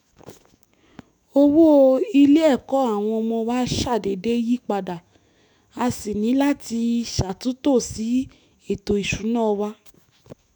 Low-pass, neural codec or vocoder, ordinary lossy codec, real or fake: 19.8 kHz; autoencoder, 48 kHz, 128 numbers a frame, DAC-VAE, trained on Japanese speech; none; fake